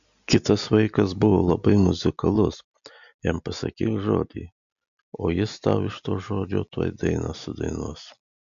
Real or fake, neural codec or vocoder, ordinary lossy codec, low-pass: real; none; MP3, 96 kbps; 7.2 kHz